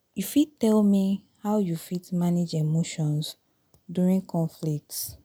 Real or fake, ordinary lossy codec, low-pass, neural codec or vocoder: real; none; none; none